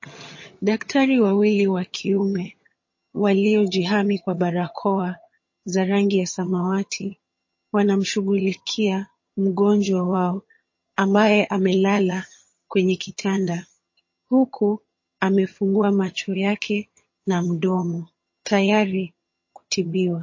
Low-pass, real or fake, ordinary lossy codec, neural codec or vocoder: 7.2 kHz; fake; MP3, 32 kbps; vocoder, 22.05 kHz, 80 mel bands, HiFi-GAN